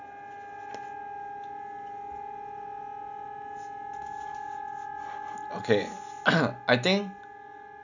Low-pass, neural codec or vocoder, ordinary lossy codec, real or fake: 7.2 kHz; none; none; real